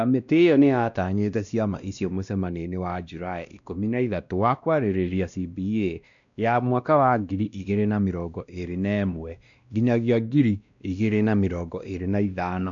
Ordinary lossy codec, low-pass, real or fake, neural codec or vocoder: none; 7.2 kHz; fake; codec, 16 kHz, 1 kbps, X-Codec, WavLM features, trained on Multilingual LibriSpeech